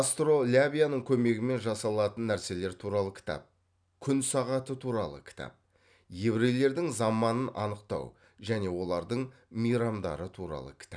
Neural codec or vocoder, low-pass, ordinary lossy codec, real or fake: none; 9.9 kHz; none; real